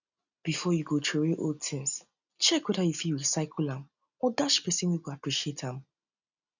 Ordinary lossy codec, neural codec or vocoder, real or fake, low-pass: none; none; real; 7.2 kHz